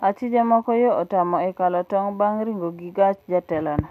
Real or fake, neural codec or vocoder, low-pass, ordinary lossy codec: real; none; 14.4 kHz; none